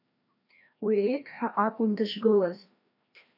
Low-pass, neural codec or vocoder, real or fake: 5.4 kHz; codec, 16 kHz, 1 kbps, FreqCodec, larger model; fake